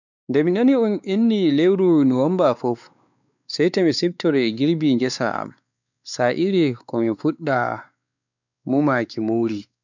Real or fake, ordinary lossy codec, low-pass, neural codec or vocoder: fake; none; 7.2 kHz; codec, 16 kHz, 4 kbps, X-Codec, WavLM features, trained on Multilingual LibriSpeech